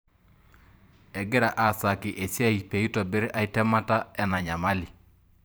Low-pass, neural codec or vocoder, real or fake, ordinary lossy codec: none; vocoder, 44.1 kHz, 128 mel bands every 512 samples, BigVGAN v2; fake; none